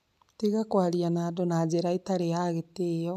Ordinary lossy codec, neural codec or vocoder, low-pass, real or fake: none; none; 14.4 kHz; real